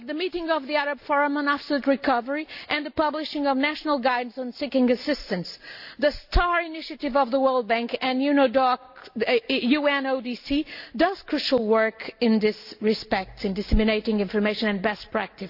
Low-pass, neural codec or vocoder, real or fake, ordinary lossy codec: 5.4 kHz; none; real; AAC, 48 kbps